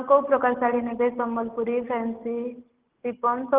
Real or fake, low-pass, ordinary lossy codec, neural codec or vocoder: real; 3.6 kHz; Opus, 16 kbps; none